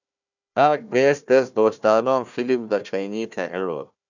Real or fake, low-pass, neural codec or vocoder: fake; 7.2 kHz; codec, 16 kHz, 1 kbps, FunCodec, trained on Chinese and English, 50 frames a second